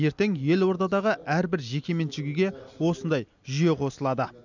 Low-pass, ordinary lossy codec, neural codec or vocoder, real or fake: 7.2 kHz; none; none; real